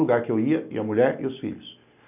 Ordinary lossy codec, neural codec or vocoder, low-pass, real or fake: none; none; 3.6 kHz; real